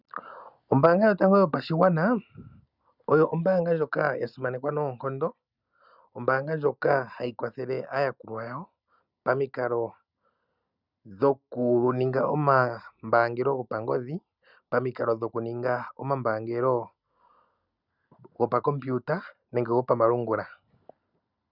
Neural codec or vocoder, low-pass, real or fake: none; 5.4 kHz; real